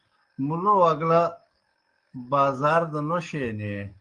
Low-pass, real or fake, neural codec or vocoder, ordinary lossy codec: 9.9 kHz; fake; codec, 44.1 kHz, 7.8 kbps, DAC; Opus, 32 kbps